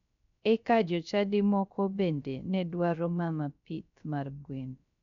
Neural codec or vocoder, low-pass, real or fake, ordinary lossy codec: codec, 16 kHz, 0.3 kbps, FocalCodec; 7.2 kHz; fake; none